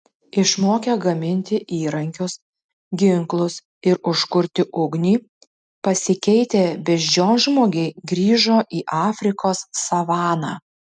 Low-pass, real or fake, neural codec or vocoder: 9.9 kHz; real; none